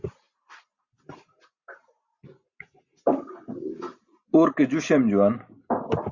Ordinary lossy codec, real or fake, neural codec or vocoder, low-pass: Opus, 64 kbps; real; none; 7.2 kHz